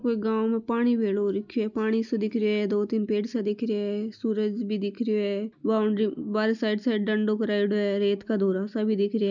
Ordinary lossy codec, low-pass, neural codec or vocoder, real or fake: none; 7.2 kHz; none; real